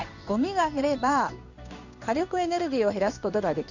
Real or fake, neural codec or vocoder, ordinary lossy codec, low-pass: fake; codec, 16 kHz in and 24 kHz out, 1 kbps, XY-Tokenizer; none; 7.2 kHz